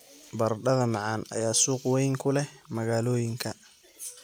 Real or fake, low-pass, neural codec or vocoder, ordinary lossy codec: real; none; none; none